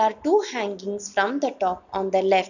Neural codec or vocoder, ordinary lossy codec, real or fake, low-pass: none; none; real; 7.2 kHz